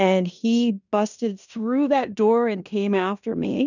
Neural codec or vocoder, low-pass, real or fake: codec, 16 kHz in and 24 kHz out, 0.9 kbps, LongCat-Audio-Codec, fine tuned four codebook decoder; 7.2 kHz; fake